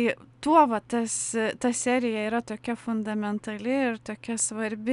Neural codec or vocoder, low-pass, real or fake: none; 10.8 kHz; real